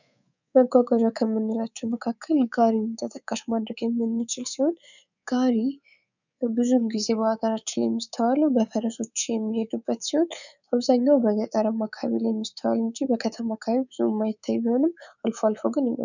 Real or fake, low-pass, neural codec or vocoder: fake; 7.2 kHz; codec, 24 kHz, 3.1 kbps, DualCodec